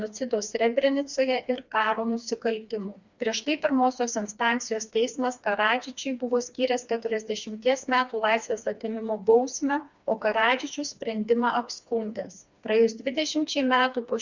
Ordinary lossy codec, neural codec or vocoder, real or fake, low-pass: Opus, 64 kbps; codec, 16 kHz, 2 kbps, FreqCodec, smaller model; fake; 7.2 kHz